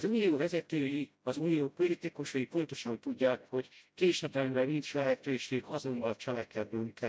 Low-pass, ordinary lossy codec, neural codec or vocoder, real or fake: none; none; codec, 16 kHz, 0.5 kbps, FreqCodec, smaller model; fake